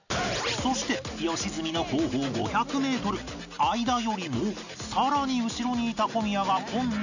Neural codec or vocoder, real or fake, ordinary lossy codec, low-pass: none; real; none; 7.2 kHz